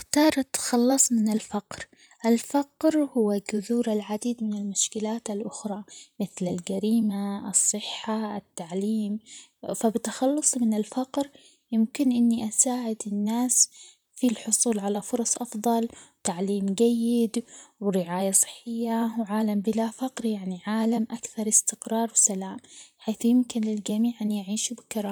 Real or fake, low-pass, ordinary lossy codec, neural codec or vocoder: fake; none; none; vocoder, 44.1 kHz, 128 mel bands, Pupu-Vocoder